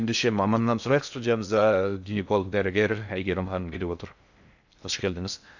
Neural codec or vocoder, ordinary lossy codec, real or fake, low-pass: codec, 16 kHz in and 24 kHz out, 0.6 kbps, FocalCodec, streaming, 2048 codes; none; fake; 7.2 kHz